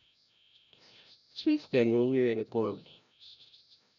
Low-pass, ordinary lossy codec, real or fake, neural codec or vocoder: 7.2 kHz; none; fake; codec, 16 kHz, 0.5 kbps, FreqCodec, larger model